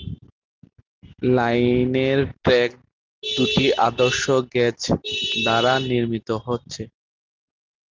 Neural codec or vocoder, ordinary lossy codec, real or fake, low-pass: none; Opus, 32 kbps; real; 7.2 kHz